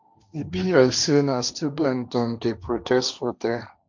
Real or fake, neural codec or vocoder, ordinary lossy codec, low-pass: fake; codec, 16 kHz, 1.1 kbps, Voila-Tokenizer; none; 7.2 kHz